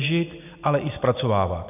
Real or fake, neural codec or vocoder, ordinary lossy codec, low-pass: real; none; AAC, 32 kbps; 3.6 kHz